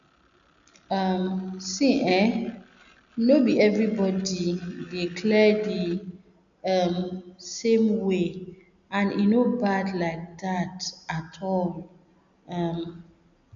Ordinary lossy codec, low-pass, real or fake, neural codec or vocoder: none; 7.2 kHz; real; none